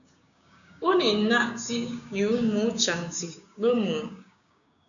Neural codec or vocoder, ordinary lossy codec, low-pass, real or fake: codec, 16 kHz, 6 kbps, DAC; MP3, 96 kbps; 7.2 kHz; fake